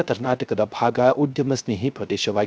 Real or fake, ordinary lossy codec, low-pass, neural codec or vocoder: fake; none; none; codec, 16 kHz, 0.3 kbps, FocalCodec